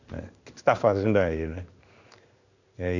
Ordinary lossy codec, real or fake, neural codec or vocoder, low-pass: none; fake; codec, 16 kHz in and 24 kHz out, 1 kbps, XY-Tokenizer; 7.2 kHz